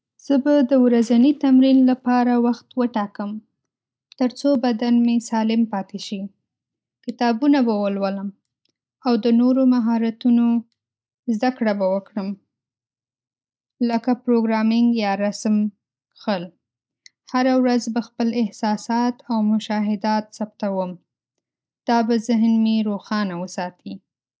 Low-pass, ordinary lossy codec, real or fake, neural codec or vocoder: none; none; real; none